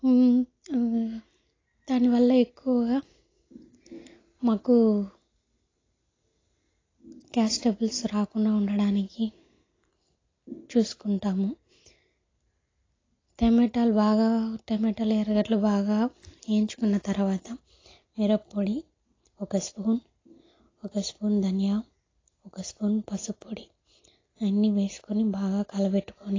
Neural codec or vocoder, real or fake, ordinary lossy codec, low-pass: none; real; AAC, 32 kbps; 7.2 kHz